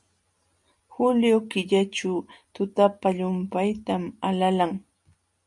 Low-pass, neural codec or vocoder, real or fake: 10.8 kHz; none; real